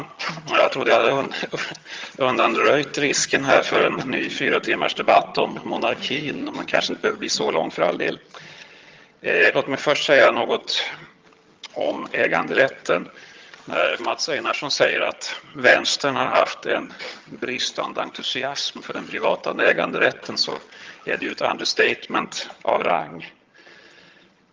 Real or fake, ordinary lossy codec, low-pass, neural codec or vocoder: fake; Opus, 32 kbps; 7.2 kHz; vocoder, 22.05 kHz, 80 mel bands, HiFi-GAN